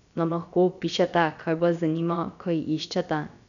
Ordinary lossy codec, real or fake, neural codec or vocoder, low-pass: none; fake; codec, 16 kHz, about 1 kbps, DyCAST, with the encoder's durations; 7.2 kHz